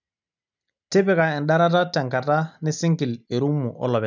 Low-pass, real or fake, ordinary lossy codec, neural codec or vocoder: 7.2 kHz; real; none; none